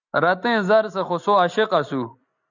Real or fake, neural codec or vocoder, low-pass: real; none; 7.2 kHz